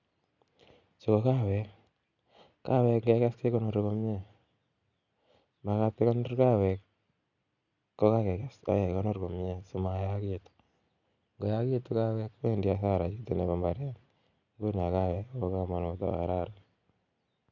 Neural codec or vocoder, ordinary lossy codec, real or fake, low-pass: none; none; real; 7.2 kHz